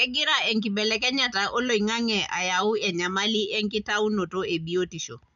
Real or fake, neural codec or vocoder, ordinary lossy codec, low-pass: real; none; none; 7.2 kHz